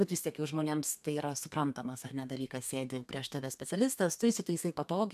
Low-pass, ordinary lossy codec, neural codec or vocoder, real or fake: 14.4 kHz; AAC, 96 kbps; codec, 32 kHz, 1.9 kbps, SNAC; fake